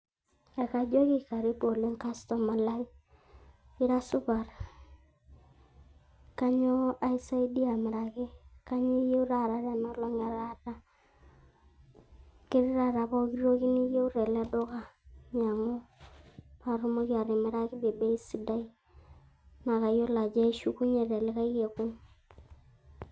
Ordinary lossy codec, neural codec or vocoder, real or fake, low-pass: none; none; real; none